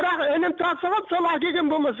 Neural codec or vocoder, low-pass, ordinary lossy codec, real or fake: none; 7.2 kHz; none; real